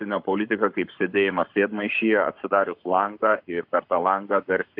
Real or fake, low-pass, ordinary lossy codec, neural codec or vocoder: fake; 5.4 kHz; AAC, 48 kbps; codec, 44.1 kHz, 7.8 kbps, Pupu-Codec